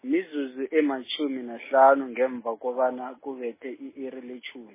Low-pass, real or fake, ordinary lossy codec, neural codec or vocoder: 3.6 kHz; real; MP3, 16 kbps; none